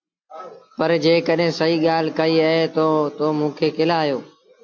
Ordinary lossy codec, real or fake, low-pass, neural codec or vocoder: AAC, 48 kbps; real; 7.2 kHz; none